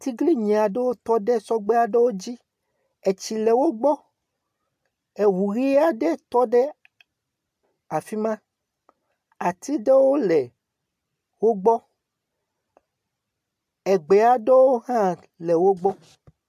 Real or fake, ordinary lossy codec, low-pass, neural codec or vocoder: real; AAC, 96 kbps; 14.4 kHz; none